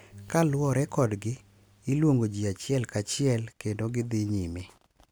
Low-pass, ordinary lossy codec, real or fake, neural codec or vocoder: none; none; real; none